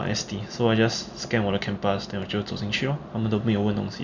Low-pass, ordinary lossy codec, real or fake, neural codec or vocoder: 7.2 kHz; none; real; none